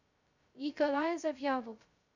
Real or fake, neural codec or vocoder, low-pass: fake; codec, 16 kHz, 0.2 kbps, FocalCodec; 7.2 kHz